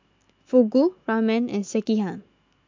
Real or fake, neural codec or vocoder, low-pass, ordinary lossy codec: fake; autoencoder, 48 kHz, 128 numbers a frame, DAC-VAE, trained on Japanese speech; 7.2 kHz; MP3, 64 kbps